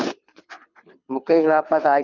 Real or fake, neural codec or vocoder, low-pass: fake; codec, 24 kHz, 6 kbps, HILCodec; 7.2 kHz